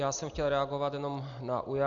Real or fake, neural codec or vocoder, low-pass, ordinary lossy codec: real; none; 7.2 kHz; Opus, 64 kbps